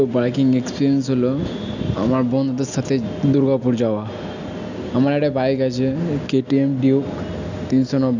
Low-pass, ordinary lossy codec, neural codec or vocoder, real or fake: 7.2 kHz; none; none; real